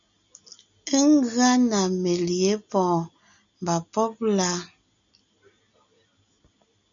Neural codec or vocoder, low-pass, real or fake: none; 7.2 kHz; real